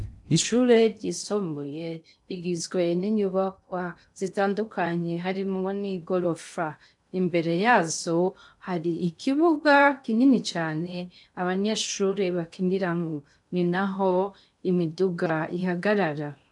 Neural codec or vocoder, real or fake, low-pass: codec, 16 kHz in and 24 kHz out, 0.6 kbps, FocalCodec, streaming, 2048 codes; fake; 10.8 kHz